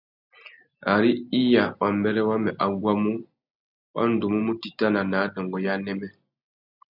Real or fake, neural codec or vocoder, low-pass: real; none; 5.4 kHz